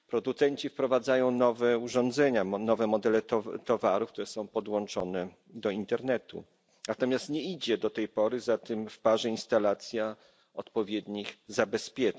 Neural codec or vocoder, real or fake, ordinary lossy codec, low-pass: none; real; none; none